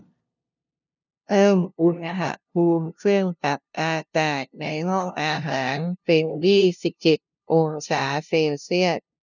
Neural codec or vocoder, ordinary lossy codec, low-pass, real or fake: codec, 16 kHz, 0.5 kbps, FunCodec, trained on LibriTTS, 25 frames a second; none; 7.2 kHz; fake